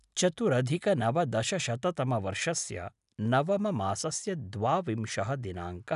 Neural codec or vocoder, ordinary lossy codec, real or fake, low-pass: none; none; real; 10.8 kHz